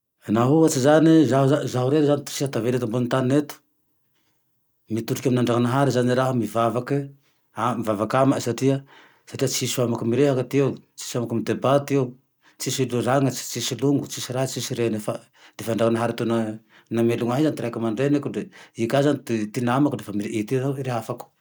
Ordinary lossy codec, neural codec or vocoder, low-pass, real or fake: none; none; none; real